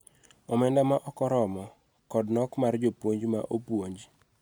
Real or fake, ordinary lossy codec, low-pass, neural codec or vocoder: real; none; none; none